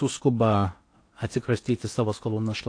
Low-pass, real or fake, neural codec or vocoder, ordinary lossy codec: 9.9 kHz; fake; codec, 16 kHz in and 24 kHz out, 0.8 kbps, FocalCodec, streaming, 65536 codes; AAC, 48 kbps